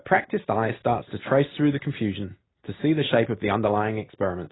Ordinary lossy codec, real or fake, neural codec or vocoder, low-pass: AAC, 16 kbps; real; none; 7.2 kHz